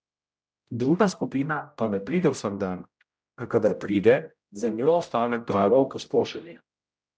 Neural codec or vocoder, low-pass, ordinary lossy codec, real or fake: codec, 16 kHz, 0.5 kbps, X-Codec, HuBERT features, trained on general audio; none; none; fake